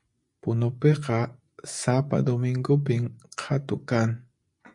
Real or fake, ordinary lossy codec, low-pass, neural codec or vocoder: fake; MP3, 64 kbps; 10.8 kHz; vocoder, 24 kHz, 100 mel bands, Vocos